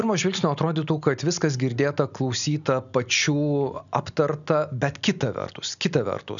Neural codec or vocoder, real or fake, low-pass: none; real; 7.2 kHz